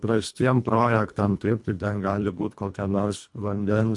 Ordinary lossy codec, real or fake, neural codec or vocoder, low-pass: MP3, 64 kbps; fake; codec, 24 kHz, 1.5 kbps, HILCodec; 10.8 kHz